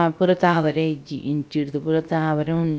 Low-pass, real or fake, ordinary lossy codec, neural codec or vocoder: none; fake; none; codec, 16 kHz, about 1 kbps, DyCAST, with the encoder's durations